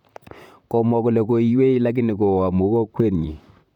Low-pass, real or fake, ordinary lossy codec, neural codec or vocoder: 19.8 kHz; fake; none; vocoder, 44.1 kHz, 128 mel bands, Pupu-Vocoder